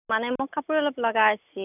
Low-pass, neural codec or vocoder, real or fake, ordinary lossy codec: 3.6 kHz; none; real; none